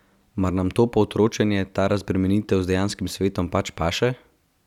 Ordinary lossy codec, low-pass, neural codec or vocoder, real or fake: none; 19.8 kHz; none; real